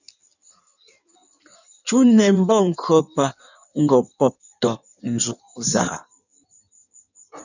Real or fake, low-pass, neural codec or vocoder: fake; 7.2 kHz; codec, 16 kHz in and 24 kHz out, 1.1 kbps, FireRedTTS-2 codec